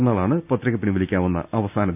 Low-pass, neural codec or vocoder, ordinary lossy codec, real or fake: 3.6 kHz; none; none; real